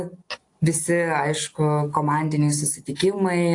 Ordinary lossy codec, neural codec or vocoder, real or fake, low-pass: AAC, 48 kbps; none; real; 10.8 kHz